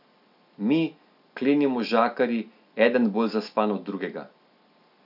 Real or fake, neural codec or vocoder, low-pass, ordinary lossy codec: real; none; 5.4 kHz; none